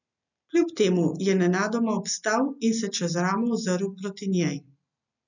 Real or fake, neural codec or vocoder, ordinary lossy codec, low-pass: real; none; none; 7.2 kHz